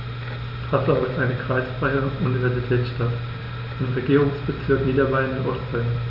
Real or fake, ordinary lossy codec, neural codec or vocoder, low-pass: real; none; none; 5.4 kHz